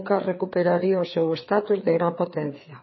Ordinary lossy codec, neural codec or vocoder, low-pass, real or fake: MP3, 24 kbps; codec, 16 kHz, 4 kbps, FreqCodec, larger model; 7.2 kHz; fake